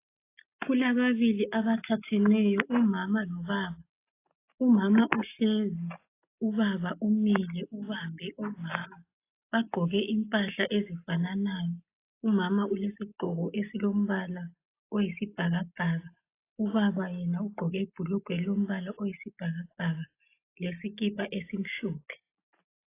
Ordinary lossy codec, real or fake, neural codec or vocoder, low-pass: AAC, 24 kbps; real; none; 3.6 kHz